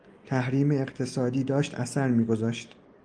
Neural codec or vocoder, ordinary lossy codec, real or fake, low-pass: none; Opus, 32 kbps; real; 9.9 kHz